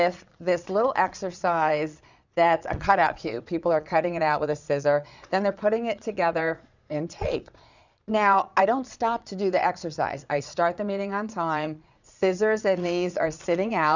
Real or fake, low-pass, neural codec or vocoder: fake; 7.2 kHz; vocoder, 22.05 kHz, 80 mel bands, Vocos